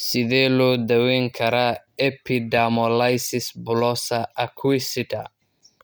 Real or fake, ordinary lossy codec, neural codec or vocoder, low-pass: real; none; none; none